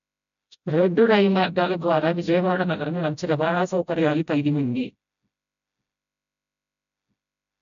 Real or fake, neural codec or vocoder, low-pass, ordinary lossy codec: fake; codec, 16 kHz, 0.5 kbps, FreqCodec, smaller model; 7.2 kHz; MP3, 96 kbps